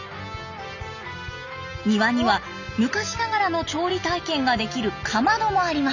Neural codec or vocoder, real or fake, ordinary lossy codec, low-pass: none; real; none; 7.2 kHz